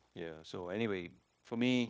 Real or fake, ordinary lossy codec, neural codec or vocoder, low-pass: fake; none; codec, 16 kHz, 0.9 kbps, LongCat-Audio-Codec; none